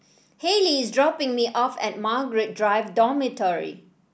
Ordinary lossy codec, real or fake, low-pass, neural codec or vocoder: none; real; none; none